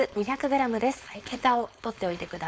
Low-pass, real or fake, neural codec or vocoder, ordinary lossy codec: none; fake; codec, 16 kHz, 4.8 kbps, FACodec; none